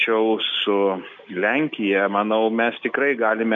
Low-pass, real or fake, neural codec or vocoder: 7.2 kHz; real; none